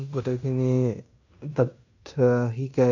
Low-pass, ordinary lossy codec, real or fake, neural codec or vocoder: 7.2 kHz; none; fake; codec, 16 kHz in and 24 kHz out, 0.9 kbps, LongCat-Audio-Codec, fine tuned four codebook decoder